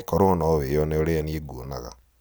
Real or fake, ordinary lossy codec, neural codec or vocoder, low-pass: real; none; none; none